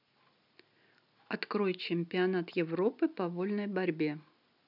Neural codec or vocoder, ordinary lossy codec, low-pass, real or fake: none; none; 5.4 kHz; real